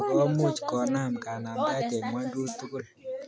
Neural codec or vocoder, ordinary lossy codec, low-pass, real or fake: none; none; none; real